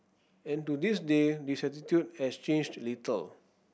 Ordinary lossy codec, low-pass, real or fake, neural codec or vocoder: none; none; real; none